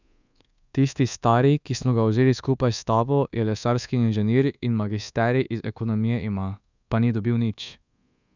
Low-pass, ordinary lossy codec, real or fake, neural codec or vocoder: 7.2 kHz; none; fake; codec, 24 kHz, 1.2 kbps, DualCodec